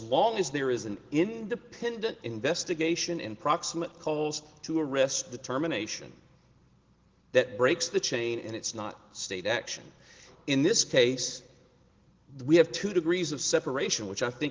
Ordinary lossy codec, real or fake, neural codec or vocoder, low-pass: Opus, 16 kbps; real; none; 7.2 kHz